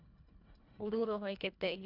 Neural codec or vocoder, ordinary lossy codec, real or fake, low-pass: codec, 24 kHz, 1.5 kbps, HILCodec; none; fake; 5.4 kHz